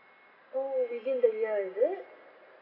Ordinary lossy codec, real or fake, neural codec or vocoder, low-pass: AAC, 24 kbps; real; none; 5.4 kHz